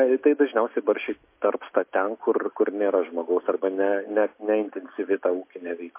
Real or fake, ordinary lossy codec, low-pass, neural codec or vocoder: real; MP3, 24 kbps; 3.6 kHz; none